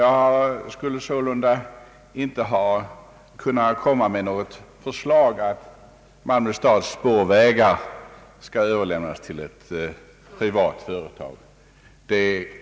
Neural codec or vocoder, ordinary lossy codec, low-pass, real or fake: none; none; none; real